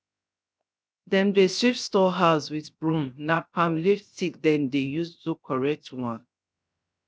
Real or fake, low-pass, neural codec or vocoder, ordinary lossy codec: fake; none; codec, 16 kHz, 0.7 kbps, FocalCodec; none